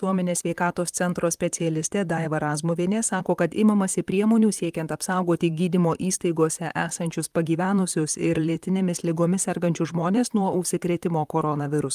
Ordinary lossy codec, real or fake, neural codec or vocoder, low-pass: Opus, 32 kbps; fake; vocoder, 44.1 kHz, 128 mel bands, Pupu-Vocoder; 14.4 kHz